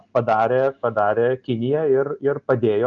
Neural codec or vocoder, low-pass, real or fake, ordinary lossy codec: none; 7.2 kHz; real; Opus, 32 kbps